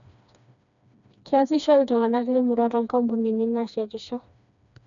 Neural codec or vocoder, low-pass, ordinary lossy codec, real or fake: codec, 16 kHz, 2 kbps, FreqCodec, smaller model; 7.2 kHz; none; fake